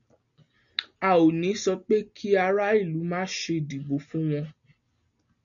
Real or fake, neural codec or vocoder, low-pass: real; none; 7.2 kHz